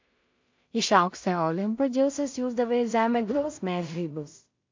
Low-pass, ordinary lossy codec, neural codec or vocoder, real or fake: 7.2 kHz; MP3, 48 kbps; codec, 16 kHz in and 24 kHz out, 0.4 kbps, LongCat-Audio-Codec, two codebook decoder; fake